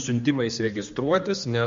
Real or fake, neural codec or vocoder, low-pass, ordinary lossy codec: fake; codec, 16 kHz, 2 kbps, X-Codec, HuBERT features, trained on general audio; 7.2 kHz; MP3, 48 kbps